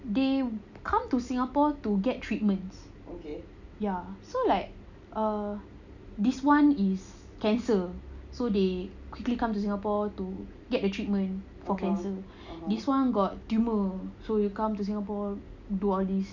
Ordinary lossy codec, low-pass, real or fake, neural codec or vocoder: none; 7.2 kHz; real; none